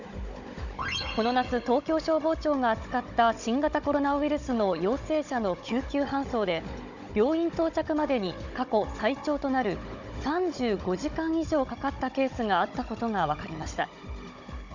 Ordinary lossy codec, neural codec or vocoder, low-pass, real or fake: none; codec, 16 kHz, 16 kbps, FunCodec, trained on Chinese and English, 50 frames a second; 7.2 kHz; fake